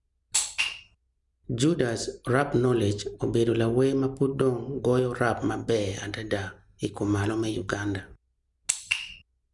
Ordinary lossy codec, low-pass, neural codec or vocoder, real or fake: none; 10.8 kHz; none; real